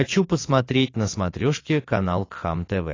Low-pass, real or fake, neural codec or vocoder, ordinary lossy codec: 7.2 kHz; fake; vocoder, 44.1 kHz, 128 mel bands every 512 samples, BigVGAN v2; AAC, 32 kbps